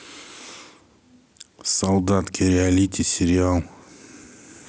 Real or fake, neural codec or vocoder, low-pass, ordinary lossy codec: real; none; none; none